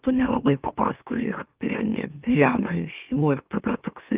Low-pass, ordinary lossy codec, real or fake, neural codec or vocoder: 3.6 kHz; Opus, 24 kbps; fake; autoencoder, 44.1 kHz, a latent of 192 numbers a frame, MeloTTS